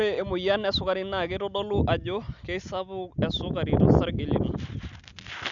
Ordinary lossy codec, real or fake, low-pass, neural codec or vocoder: Opus, 64 kbps; real; 7.2 kHz; none